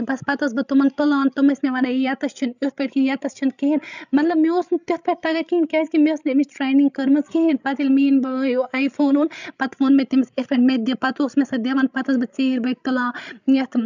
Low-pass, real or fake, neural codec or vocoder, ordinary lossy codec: 7.2 kHz; fake; codec, 16 kHz, 16 kbps, FreqCodec, larger model; none